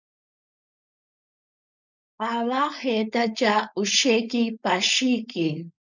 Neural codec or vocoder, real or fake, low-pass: codec, 16 kHz, 4.8 kbps, FACodec; fake; 7.2 kHz